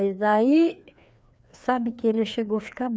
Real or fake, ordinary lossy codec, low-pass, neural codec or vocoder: fake; none; none; codec, 16 kHz, 2 kbps, FreqCodec, larger model